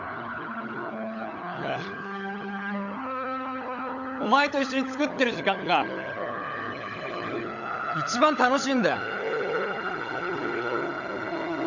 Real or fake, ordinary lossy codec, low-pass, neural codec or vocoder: fake; none; 7.2 kHz; codec, 16 kHz, 16 kbps, FunCodec, trained on LibriTTS, 50 frames a second